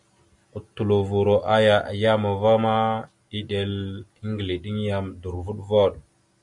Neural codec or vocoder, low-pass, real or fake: none; 10.8 kHz; real